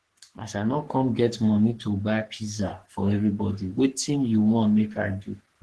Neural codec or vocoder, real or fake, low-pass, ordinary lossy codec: codec, 44.1 kHz, 3.4 kbps, Pupu-Codec; fake; 10.8 kHz; Opus, 16 kbps